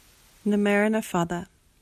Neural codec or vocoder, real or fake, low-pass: none; real; 14.4 kHz